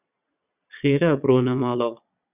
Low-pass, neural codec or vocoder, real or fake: 3.6 kHz; vocoder, 22.05 kHz, 80 mel bands, Vocos; fake